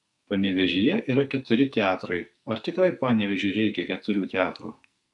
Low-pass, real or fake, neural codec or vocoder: 10.8 kHz; fake; codec, 44.1 kHz, 2.6 kbps, SNAC